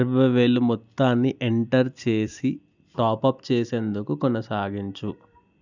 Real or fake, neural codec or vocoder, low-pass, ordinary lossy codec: real; none; 7.2 kHz; none